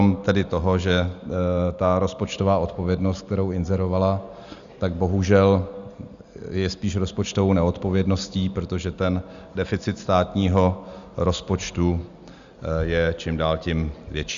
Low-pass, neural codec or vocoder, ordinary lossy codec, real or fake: 7.2 kHz; none; Opus, 64 kbps; real